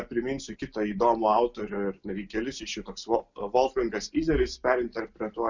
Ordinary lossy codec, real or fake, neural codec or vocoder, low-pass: Opus, 64 kbps; real; none; 7.2 kHz